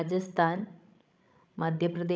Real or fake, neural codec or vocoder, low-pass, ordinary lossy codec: fake; codec, 16 kHz, 16 kbps, FreqCodec, larger model; none; none